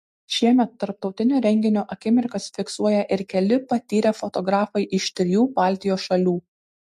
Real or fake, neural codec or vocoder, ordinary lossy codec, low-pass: real; none; MP3, 64 kbps; 14.4 kHz